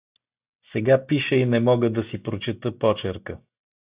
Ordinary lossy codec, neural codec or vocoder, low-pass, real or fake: Opus, 64 kbps; none; 3.6 kHz; real